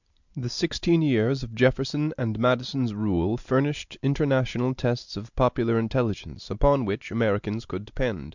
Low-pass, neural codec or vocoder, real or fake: 7.2 kHz; none; real